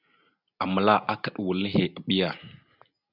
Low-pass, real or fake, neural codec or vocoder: 5.4 kHz; real; none